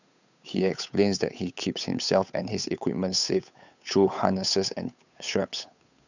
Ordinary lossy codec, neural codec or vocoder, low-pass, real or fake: none; codec, 16 kHz, 8 kbps, FunCodec, trained on Chinese and English, 25 frames a second; 7.2 kHz; fake